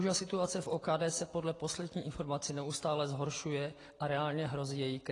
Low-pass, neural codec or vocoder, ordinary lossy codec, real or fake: 10.8 kHz; none; AAC, 32 kbps; real